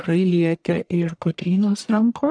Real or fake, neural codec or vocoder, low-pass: fake; codec, 44.1 kHz, 1.7 kbps, Pupu-Codec; 9.9 kHz